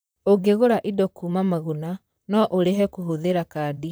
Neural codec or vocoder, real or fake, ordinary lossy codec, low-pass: vocoder, 44.1 kHz, 128 mel bands, Pupu-Vocoder; fake; none; none